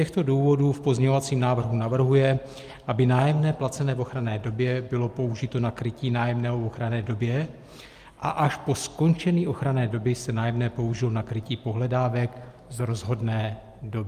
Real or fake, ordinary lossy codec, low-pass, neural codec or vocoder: real; Opus, 32 kbps; 14.4 kHz; none